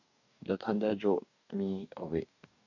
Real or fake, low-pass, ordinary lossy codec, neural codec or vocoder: fake; 7.2 kHz; none; codec, 44.1 kHz, 2.6 kbps, DAC